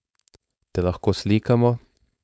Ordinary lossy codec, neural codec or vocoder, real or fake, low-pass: none; codec, 16 kHz, 4.8 kbps, FACodec; fake; none